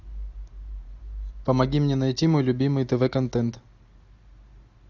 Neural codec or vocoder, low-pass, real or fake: none; 7.2 kHz; real